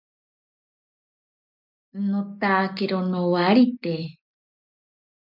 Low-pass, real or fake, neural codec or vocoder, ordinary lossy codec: 5.4 kHz; real; none; MP3, 48 kbps